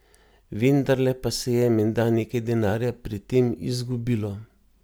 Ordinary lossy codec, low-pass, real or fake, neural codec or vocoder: none; none; real; none